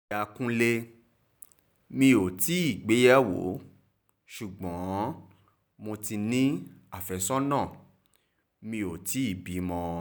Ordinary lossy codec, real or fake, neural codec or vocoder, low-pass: none; real; none; none